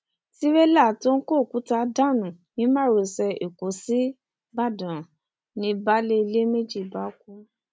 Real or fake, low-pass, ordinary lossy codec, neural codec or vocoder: real; none; none; none